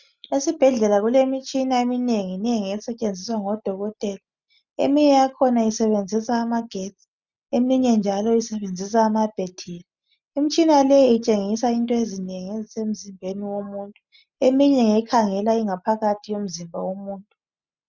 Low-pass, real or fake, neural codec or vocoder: 7.2 kHz; real; none